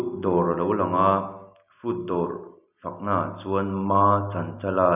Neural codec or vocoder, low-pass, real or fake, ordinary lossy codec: none; 3.6 kHz; real; none